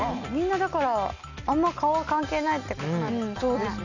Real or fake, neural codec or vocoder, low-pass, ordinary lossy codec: real; none; 7.2 kHz; none